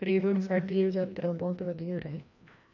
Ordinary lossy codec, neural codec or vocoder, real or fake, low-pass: none; codec, 16 kHz, 1 kbps, FreqCodec, larger model; fake; 7.2 kHz